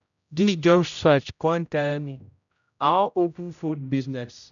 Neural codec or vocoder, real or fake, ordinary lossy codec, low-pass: codec, 16 kHz, 0.5 kbps, X-Codec, HuBERT features, trained on general audio; fake; none; 7.2 kHz